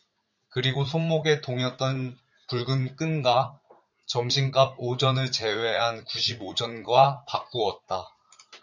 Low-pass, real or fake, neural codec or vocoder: 7.2 kHz; fake; vocoder, 44.1 kHz, 80 mel bands, Vocos